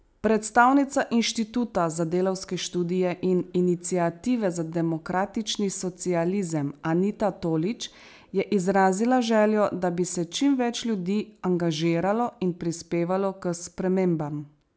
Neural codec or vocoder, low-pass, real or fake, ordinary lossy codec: none; none; real; none